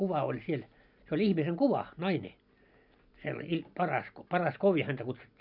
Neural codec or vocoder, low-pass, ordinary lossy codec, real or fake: none; 5.4 kHz; none; real